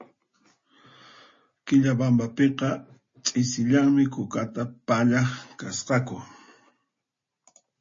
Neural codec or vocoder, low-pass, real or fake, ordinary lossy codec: none; 7.2 kHz; real; MP3, 32 kbps